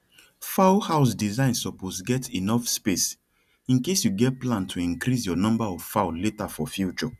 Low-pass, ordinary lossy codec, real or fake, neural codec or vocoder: 14.4 kHz; none; real; none